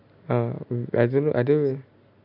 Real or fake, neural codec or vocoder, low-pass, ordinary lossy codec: real; none; 5.4 kHz; none